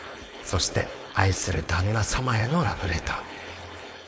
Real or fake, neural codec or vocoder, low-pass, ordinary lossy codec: fake; codec, 16 kHz, 4.8 kbps, FACodec; none; none